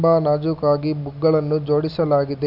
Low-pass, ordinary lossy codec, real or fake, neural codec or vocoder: 5.4 kHz; none; real; none